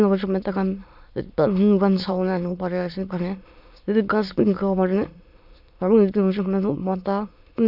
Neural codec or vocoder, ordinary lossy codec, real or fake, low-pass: autoencoder, 22.05 kHz, a latent of 192 numbers a frame, VITS, trained on many speakers; none; fake; 5.4 kHz